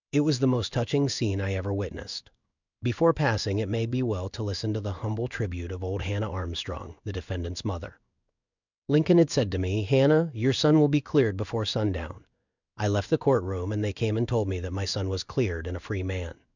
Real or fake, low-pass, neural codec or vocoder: fake; 7.2 kHz; codec, 16 kHz in and 24 kHz out, 1 kbps, XY-Tokenizer